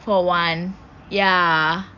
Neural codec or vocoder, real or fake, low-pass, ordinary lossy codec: none; real; 7.2 kHz; none